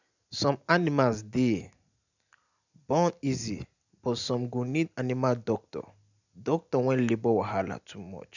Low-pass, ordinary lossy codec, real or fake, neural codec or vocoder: 7.2 kHz; none; real; none